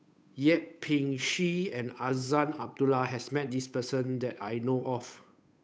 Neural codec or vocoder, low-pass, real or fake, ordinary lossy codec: codec, 16 kHz, 8 kbps, FunCodec, trained on Chinese and English, 25 frames a second; none; fake; none